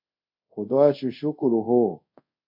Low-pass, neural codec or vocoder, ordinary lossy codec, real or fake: 5.4 kHz; codec, 24 kHz, 0.5 kbps, DualCodec; AAC, 48 kbps; fake